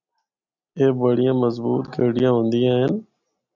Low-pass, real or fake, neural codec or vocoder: 7.2 kHz; real; none